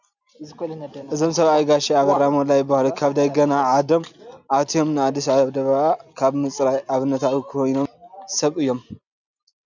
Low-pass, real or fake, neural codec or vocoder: 7.2 kHz; real; none